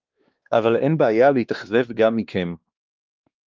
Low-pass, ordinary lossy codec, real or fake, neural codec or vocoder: 7.2 kHz; Opus, 32 kbps; fake; codec, 16 kHz, 2 kbps, X-Codec, WavLM features, trained on Multilingual LibriSpeech